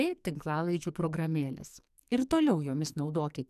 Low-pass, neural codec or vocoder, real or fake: 14.4 kHz; codec, 44.1 kHz, 2.6 kbps, SNAC; fake